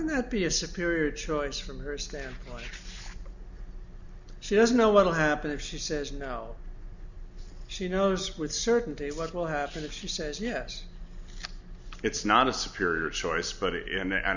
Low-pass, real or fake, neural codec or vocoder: 7.2 kHz; real; none